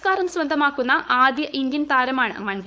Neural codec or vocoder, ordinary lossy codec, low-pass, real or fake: codec, 16 kHz, 4.8 kbps, FACodec; none; none; fake